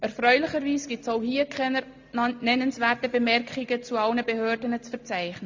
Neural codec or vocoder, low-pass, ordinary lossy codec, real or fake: none; 7.2 kHz; MP3, 48 kbps; real